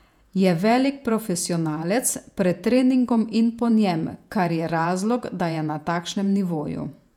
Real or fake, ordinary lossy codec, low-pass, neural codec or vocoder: fake; none; 19.8 kHz; vocoder, 48 kHz, 128 mel bands, Vocos